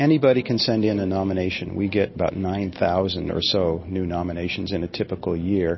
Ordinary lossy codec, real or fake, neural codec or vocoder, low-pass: MP3, 24 kbps; real; none; 7.2 kHz